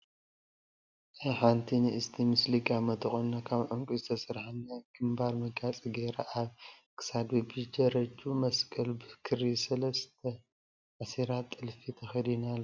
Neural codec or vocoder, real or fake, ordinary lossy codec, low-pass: none; real; MP3, 64 kbps; 7.2 kHz